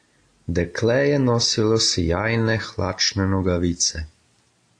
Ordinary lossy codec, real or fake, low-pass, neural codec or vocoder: AAC, 48 kbps; real; 9.9 kHz; none